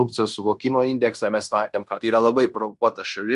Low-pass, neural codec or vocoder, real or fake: 10.8 kHz; codec, 16 kHz in and 24 kHz out, 0.9 kbps, LongCat-Audio-Codec, fine tuned four codebook decoder; fake